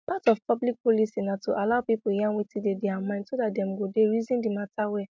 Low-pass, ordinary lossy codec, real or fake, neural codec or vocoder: none; none; real; none